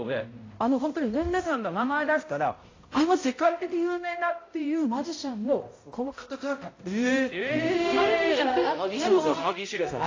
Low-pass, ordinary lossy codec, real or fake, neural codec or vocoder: 7.2 kHz; AAC, 32 kbps; fake; codec, 16 kHz, 0.5 kbps, X-Codec, HuBERT features, trained on balanced general audio